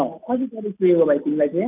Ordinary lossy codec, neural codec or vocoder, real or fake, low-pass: none; none; real; 3.6 kHz